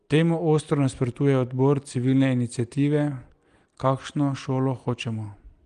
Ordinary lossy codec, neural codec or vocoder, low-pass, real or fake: Opus, 24 kbps; none; 9.9 kHz; real